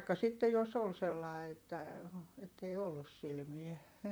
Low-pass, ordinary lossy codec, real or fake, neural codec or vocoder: none; none; fake; vocoder, 44.1 kHz, 128 mel bands, Pupu-Vocoder